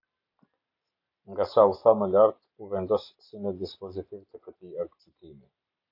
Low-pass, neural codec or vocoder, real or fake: 5.4 kHz; none; real